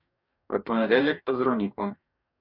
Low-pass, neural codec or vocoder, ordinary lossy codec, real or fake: 5.4 kHz; codec, 44.1 kHz, 2.6 kbps, DAC; MP3, 48 kbps; fake